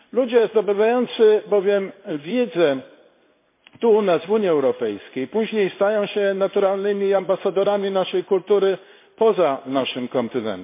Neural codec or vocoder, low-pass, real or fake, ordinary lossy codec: codec, 16 kHz in and 24 kHz out, 1 kbps, XY-Tokenizer; 3.6 kHz; fake; MP3, 24 kbps